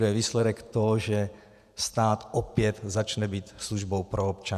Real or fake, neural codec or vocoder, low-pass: fake; vocoder, 48 kHz, 128 mel bands, Vocos; 14.4 kHz